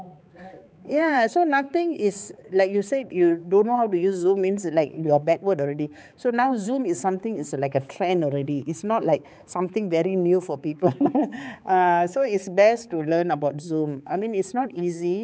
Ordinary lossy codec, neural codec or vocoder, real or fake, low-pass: none; codec, 16 kHz, 4 kbps, X-Codec, HuBERT features, trained on balanced general audio; fake; none